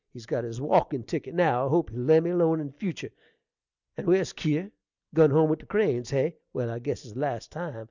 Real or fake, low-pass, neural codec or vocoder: real; 7.2 kHz; none